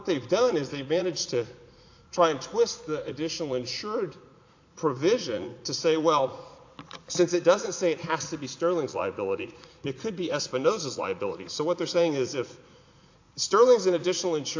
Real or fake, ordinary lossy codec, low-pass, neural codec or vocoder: fake; AAC, 48 kbps; 7.2 kHz; vocoder, 44.1 kHz, 80 mel bands, Vocos